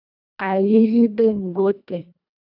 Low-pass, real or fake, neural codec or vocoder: 5.4 kHz; fake; codec, 24 kHz, 1.5 kbps, HILCodec